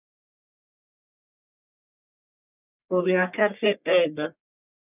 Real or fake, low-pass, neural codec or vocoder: fake; 3.6 kHz; codec, 44.1 kHz, 1.7 kbps, Pupu-Codec